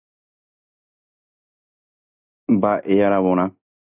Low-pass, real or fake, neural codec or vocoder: 3.6 kHz; real; none